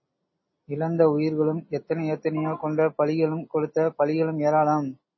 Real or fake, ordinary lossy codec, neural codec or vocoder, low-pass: real; MP3, 24 kbps; none; 7.2 kHz